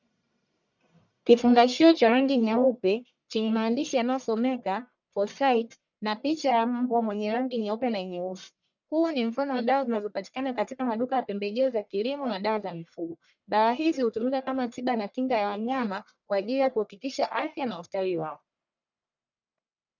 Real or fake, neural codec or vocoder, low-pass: fake; codec, 44.1 kHz, 1.7 kbps, Pupu-Codec; 7.2 kHz